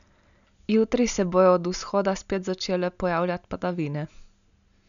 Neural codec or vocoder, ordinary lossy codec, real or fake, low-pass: none; none; real; 7.2 kHz